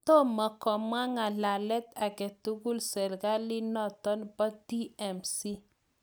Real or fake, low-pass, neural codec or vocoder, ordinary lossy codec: real; none; none; none